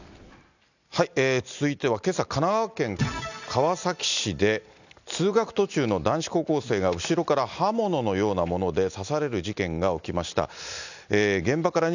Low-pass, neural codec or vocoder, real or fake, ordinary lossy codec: 7.2 kHz; none; real; none